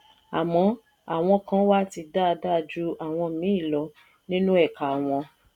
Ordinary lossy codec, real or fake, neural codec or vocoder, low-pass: none; real; none; 19.8 kHz